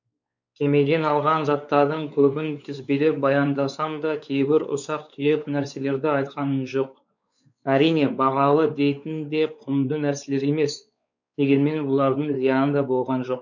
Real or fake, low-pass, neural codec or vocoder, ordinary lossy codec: fake; 7.2 kHz; codec, 16 kHz, 4 kbps, X-Codec, WavLM features, trained on Multilingual LibriSpeech; none